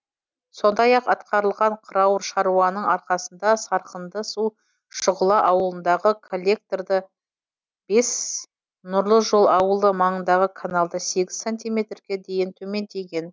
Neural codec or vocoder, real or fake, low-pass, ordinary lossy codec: none; real; none; none